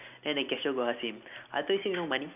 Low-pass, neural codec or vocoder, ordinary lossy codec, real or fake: 3.6 kHz; none; none; real